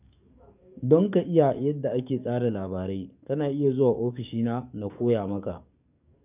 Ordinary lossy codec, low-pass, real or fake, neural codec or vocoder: none; 3.6 kHz; fake; codec, 44.1 kHz, 7.8 kbps, DAC